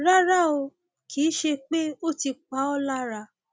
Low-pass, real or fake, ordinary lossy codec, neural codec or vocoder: none; real; none; none